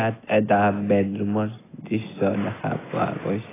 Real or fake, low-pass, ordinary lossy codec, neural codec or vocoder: real; 3.6 kHz; AAC, 16 kbps; none